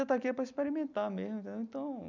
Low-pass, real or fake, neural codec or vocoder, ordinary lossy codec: 7.2 kHz; real; none; none